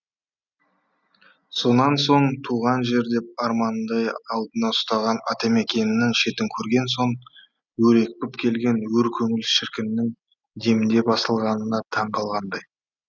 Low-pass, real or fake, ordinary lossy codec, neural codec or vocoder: 7.2 kHz; real; none; none